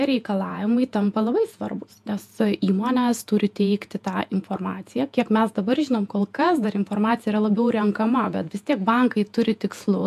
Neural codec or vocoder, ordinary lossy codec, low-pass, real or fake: vocoder, 48 kHz, 128 mel bands, Vocos; AAC, 96 kbps; 14.4 kHz; fake